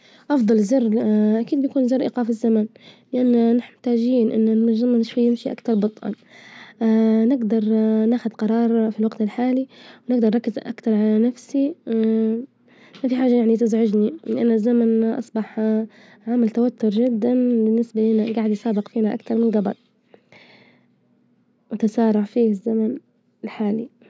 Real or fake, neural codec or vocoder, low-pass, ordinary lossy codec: real; none; none; none